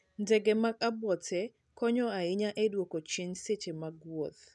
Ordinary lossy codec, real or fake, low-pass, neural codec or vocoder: none; real; 10.8 kHz; none